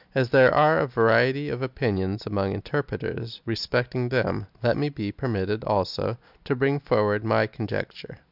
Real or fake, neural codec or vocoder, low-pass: real; none; 5.4 kHz